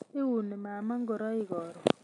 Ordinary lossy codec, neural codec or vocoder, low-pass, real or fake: none; none; 10.8 kHz; real